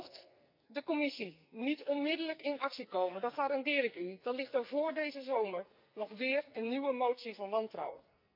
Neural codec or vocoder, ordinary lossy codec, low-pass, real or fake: codec, 44.1 kHz, 2.6 kbps, SNAC; none; 5.4 kHz; fake